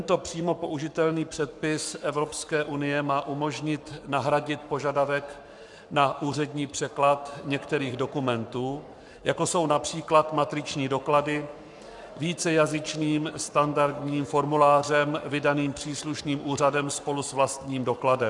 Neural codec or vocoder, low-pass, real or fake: codec, 44.1 kHz, 7.8 kbps, Pupu-Codec; 10.8 kHz; fake